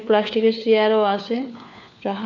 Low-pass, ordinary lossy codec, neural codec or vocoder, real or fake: 7.2 kHz; none; codec, 16 kHz, 4 kbps, FunCodec, trained on LibriTTS, 50 frames a second; fake